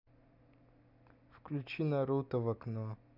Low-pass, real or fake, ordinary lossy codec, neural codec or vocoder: 5.4 kHz; real; none; none